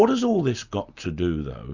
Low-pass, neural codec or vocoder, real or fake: 7.2 kHz; none; real